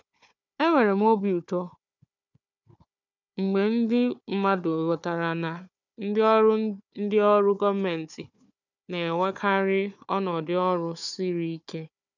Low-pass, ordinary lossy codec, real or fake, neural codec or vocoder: 7.2 kHz; none; fake; codec, 16 kHz, 4 kbps, FunCodec, trained on Chinese and English, 50 frames a second